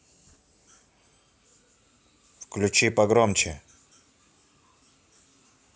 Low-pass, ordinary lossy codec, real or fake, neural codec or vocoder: none; none; real; none